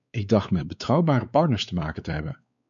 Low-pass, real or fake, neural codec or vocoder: 7.2 kHz; fake; codec, 16 kHz, 4 kbps, X-Codec, WavLM features, trained on Multilingual LibriSpeech